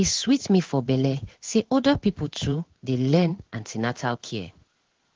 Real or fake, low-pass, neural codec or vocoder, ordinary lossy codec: fake; 7.2 kHz; codec, 16 kHz in and 24 kHz out, 1 kbps, XY-Tokenizer; Opus, 16 kbps